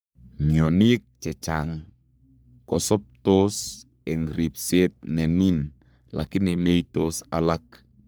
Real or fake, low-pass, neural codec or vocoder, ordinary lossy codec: fake; none; codec, 44.1 kHz, 3.4 kbps, Pupu-Codec; none